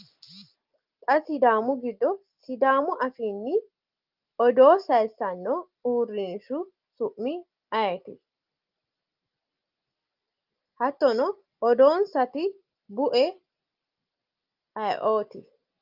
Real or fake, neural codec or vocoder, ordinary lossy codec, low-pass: real; none; Opus, 32 kbps; 5.4 kHz